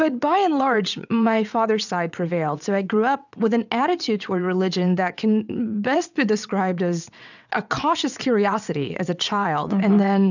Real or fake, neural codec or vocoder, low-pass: fake; vocoder, 22.05 kHz, 80 mel bands, WaveNeXt; 7.2 kHz